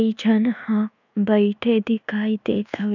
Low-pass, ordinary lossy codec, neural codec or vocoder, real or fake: 7.2 kHz; none; codec, 24 kHz, 1.2 kbps, DualCodec; fake